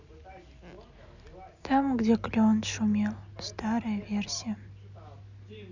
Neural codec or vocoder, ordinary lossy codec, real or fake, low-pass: none; none; real; 7.2 kHz